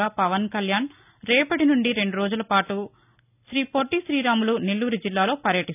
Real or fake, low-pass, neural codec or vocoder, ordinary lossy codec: real; 3.6 kHz; none; none